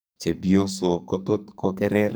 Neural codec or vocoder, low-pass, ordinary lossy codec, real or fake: codec, 44.1 kHz, 2.6 kbps, SNAC; none; none; fake